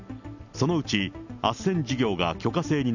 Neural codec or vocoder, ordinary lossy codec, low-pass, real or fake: none; none; 7.2 kHz; real